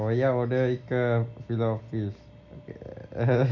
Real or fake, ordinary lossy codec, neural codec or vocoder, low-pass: real; none; none; 7.2 kHz